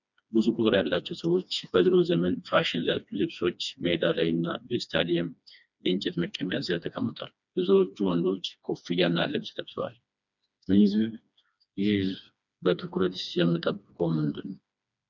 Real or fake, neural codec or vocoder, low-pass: fake; codec, 16 kHz, 2 kbps, FreqCodec, smaller model; 7.2 kHz